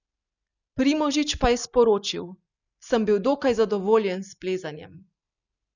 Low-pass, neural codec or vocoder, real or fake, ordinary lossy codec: 7.2 kHz; none; real; none